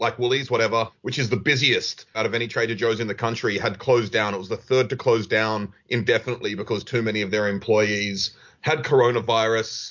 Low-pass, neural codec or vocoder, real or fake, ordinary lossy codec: 7.2 kHz; none; real; MP3, 48 kbps